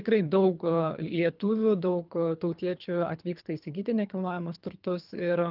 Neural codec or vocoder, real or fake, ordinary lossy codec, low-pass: codec, 24 kHz, 3 kbps, HILCodec; fake; Opus, 32 kbps; 5.4 kHz